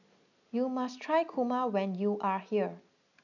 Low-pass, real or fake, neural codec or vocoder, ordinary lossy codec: 7.2 kHz; real; none; none